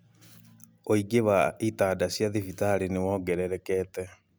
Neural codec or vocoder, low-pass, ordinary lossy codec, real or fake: none; none; none; real